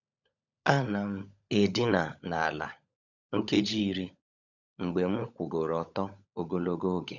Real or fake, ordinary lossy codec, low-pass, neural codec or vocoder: fake; none; 7.2 kHz; codec, 16 kHz, 16 kbps, FunCodec, trained on LibriTTS, 50 frames a second